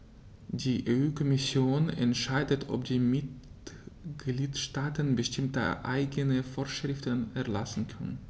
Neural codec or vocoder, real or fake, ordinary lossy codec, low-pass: none; real; none; none